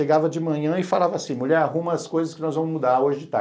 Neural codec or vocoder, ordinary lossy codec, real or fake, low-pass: none; none; real; none